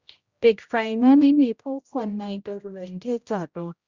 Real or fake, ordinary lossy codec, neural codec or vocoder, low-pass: fake; Opus, 64 kbps; codec, 16 kHz, 0.5 kbps, X-Codec, HuBERT features, trained on general audio; 7.2 kHz